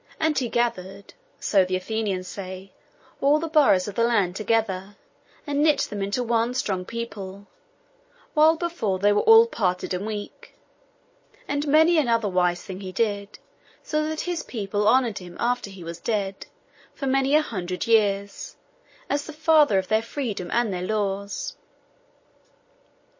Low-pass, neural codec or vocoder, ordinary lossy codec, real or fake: 7.2 kHz; none; MP3, 32 kbps; real